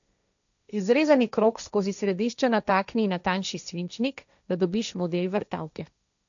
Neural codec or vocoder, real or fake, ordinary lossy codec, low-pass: codec, 16 kHz, 1.1 kbps, Voila-Tokenizer; fake; none; 7.2 kHz